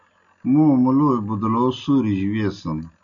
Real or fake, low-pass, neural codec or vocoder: real; 7.2 kHz; none